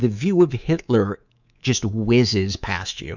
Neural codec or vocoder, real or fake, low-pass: codec, 24 kHz, 3.1 kbps, DualCodec; fake; 7.2 kHz